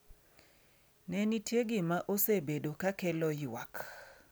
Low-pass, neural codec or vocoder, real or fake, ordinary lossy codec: none; none; real; none